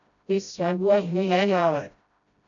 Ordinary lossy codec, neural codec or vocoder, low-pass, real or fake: AAC, 64 kbps; codec, 16 kHz, 0.5 kbps, FreqCodec, smaller model; 7.2 kHz; fake